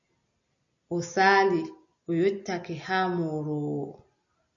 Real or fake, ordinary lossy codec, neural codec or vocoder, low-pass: real; MP3, 96 kbps; none; 7.2 kHz